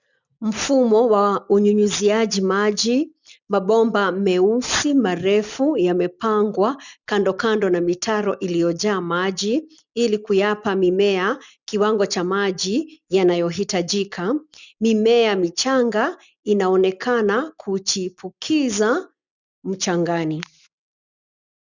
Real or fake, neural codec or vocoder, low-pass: real; none; 7.2 kHz